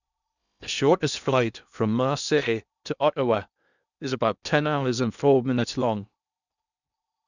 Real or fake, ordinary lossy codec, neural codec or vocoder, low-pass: fake; none; codec, 16 kHz in and 24 kHz out, 0.8 kbps, FocalCodec, streaming, 65536 codes; 7.2 kHz